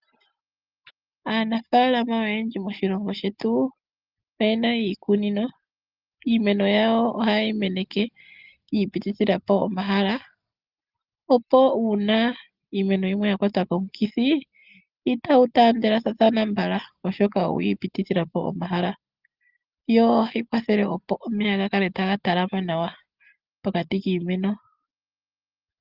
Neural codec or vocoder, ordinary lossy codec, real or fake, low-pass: none; Opus, 32 kbps; real; 5.4 kHz